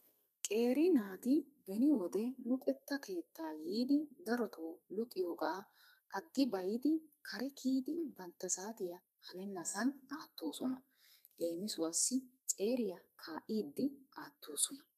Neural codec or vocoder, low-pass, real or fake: codec, 32 kHz, 1.9 kbps, SNAC; 14.4 kHz; fake